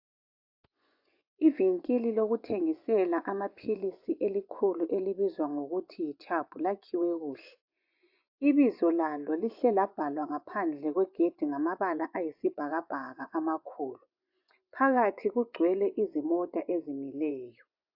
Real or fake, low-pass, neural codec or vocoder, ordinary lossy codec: fake; 5.4 kHz; vocoder, 24 kHz, 100 mel bands, Vocos; AAC, 48 kbps